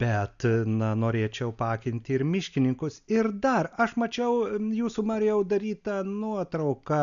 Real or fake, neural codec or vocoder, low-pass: real; none; 7.2 kHz